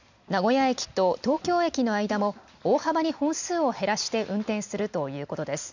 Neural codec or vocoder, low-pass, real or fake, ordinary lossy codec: none; 7.2 kHz; real; none